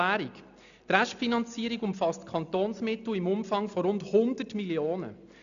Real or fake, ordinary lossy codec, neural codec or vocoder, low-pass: real; none; none; 7.2 kHz